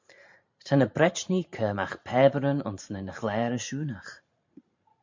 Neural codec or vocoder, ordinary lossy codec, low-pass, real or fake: vocoder, 44.1 kHz, 128 mel bands every 512 samples, BigVGAN v2; MP3, 48 kbps; 7.2 kHz; fake